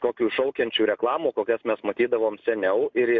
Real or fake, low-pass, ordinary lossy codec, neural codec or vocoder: real; 7.2 kHz; MP3, 64 kbps; none